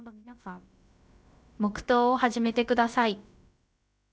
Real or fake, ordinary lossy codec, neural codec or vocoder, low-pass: fake; none; codec, 16 kHz, about 1 kbps, DyCAST, with the encoder's durations; none